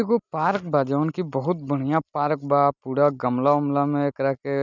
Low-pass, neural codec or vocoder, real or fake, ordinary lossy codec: 7.2 kHz; none; real; none